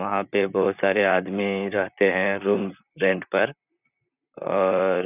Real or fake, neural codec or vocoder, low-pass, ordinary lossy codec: fake; vocoder, 44.1 kHz, 128 mel bands, Pupu-Vocoder; 3.6 kHz; none